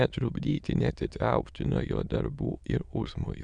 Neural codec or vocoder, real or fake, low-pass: autoencoder, 22.05 kHz, a latent of 192 numbers a frame, VITS, trained on many speakers; fake; 9.9 kHz